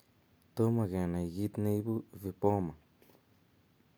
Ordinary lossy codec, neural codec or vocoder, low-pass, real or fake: none; none; none; real